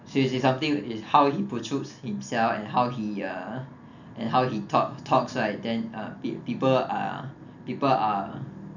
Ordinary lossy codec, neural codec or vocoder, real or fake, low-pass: none; none; real; 7.2 kHz